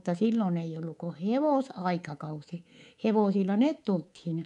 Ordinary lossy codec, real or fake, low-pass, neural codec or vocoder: none; fake; 10.8 kHz; codec, 24 kHz, 3.1 kbps, DualCodec